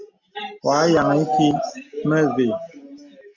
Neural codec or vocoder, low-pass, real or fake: none; 7.2 kHz; real